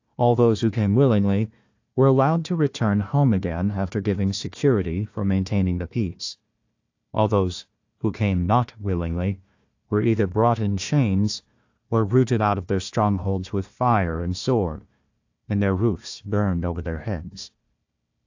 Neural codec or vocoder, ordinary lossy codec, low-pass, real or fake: codec, 16 kHz, 1 kbps, FunCodec, trained on Chinese and English, 50 frames a second; AAC, 48 kbps; 7.2 kHz; fake